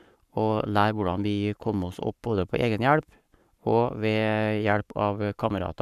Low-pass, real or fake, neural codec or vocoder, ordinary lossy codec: 14.4 kHz; fake; codec, 44.1 kHz, 7.8 kbps, Pupu-Codec; none